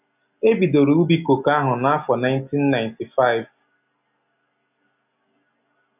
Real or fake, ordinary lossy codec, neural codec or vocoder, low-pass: real; none; none; 3.6 kHz